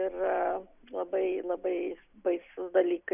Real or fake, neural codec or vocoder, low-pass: real; none; 3.6 kHz